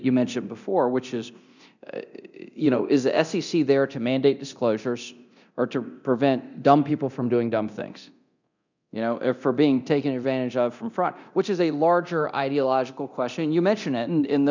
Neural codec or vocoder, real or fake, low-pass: codec, 24 kHz, 0.9 kbps, DualCodec; fake; 7.2 kHz